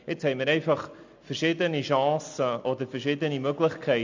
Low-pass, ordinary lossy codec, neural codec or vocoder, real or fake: 7.2 kHz; MP3, 64 kbps; none; real